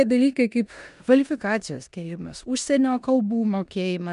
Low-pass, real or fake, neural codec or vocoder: 10.8 kHz; fake; codec, 16 kHz in and 24 kHz out, 0.9 kbps, LongCat-Audio-Codec, four codebook decoder